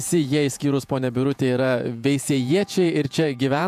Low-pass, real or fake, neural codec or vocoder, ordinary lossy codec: 14.4 kHz; fake; vocoder, 48 kHz, 128 mel bands, Vocos; MP3, 96 kbps